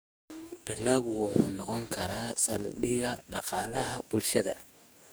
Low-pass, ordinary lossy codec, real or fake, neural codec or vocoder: none; none; fake; codec, 44.1 kHz, 2.6 kbps, DAC